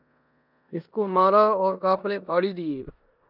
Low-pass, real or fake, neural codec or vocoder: 5.4 kHz; fake; codec, 16 kHz in and 24 kHz out, 0.9 kbps, LongCat-Audio-Codec, four codebook decoder